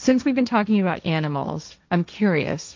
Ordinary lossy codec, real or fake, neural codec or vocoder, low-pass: MP3, 64 kbps; fake; codec, 16 kHz, 1.1 kbps, Voila-Tokenizer; 7.2 kHz